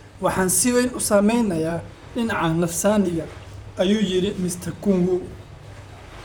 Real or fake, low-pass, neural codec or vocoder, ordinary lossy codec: fake; none; vocoder, 44.1 kHz, 128 mel bands, Pupu-Vocoder; none